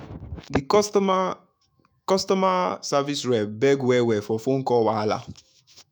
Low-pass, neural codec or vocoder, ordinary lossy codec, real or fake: none; autoencoder, 48 kHz, 128 numbers a frame, DAC-VAE, trained on Japanese speech; none; fake